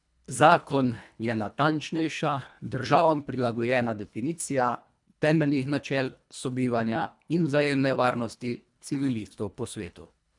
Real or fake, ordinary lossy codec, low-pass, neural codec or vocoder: fake; none; 10.8 kHz; codec, 24 kHz, 1.5 kbps, HILCodec